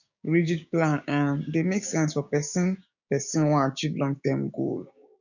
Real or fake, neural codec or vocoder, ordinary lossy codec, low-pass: fake; codec, 16 kHz, 6 kbps, DAC; none; 7.2 kHz